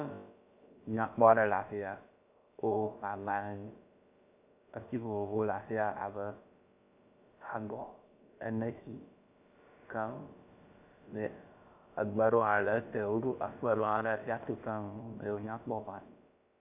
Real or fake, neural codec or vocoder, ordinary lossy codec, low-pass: fake; codec, 16 kHz, about 1 kbps, DyCAST, with the encoder's durations; AAC, 32 kbps; 3.6 kHz